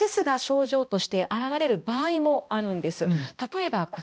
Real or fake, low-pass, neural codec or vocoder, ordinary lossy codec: fake; none; codec, 16 kHz, 1 kbps, X-Codec, HuBERT features, trained on balanced general audio; none